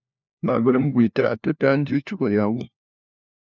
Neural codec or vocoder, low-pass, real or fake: codec, 16 kHz, 1 kbps, FunCodec, trained on LibriTTS, 50 frames a second; 7.2 kHz; fake